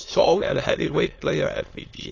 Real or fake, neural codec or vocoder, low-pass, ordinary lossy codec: fake; autoencoder, 22.05 kHz, a latent of 192 numbers a frame, VITS, trained on many speakers; 7.2 kHz; AAC, 32 kbps